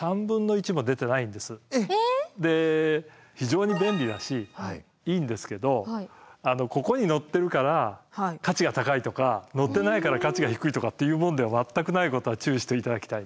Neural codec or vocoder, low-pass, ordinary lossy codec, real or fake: none; none; none; real